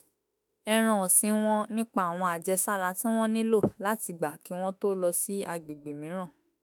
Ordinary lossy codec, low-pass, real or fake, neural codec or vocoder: none; none; fake; autoencoder, 48 kHz, 32 numbers a frame, DAC-VAE, trained on Japanese speech